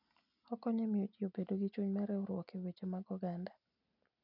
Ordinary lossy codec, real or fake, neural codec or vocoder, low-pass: none; real; none; 5.4 kHz